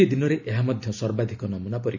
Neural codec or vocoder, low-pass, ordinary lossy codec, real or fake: none; 7.2 kHz; none; real